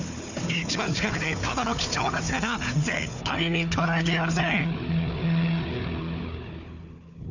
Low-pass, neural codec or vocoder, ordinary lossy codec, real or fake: 7.2 kHz; codec, 16 kHz, 4 kbps, FunCodec, trained on Chinese and English, 50 frames a second; none; fake